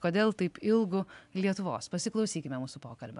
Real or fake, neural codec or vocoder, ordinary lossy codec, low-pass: real; none; AAC, 96 kbps; 10.8 kHz